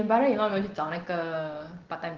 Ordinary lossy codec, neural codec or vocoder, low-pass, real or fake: Opus, 16 kbps; none; 7.2 kHz; real